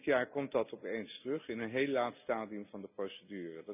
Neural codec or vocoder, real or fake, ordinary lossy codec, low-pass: none; real; none; 3.6 kHz